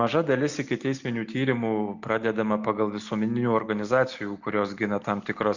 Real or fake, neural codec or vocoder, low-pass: real; none; 7.2 kHz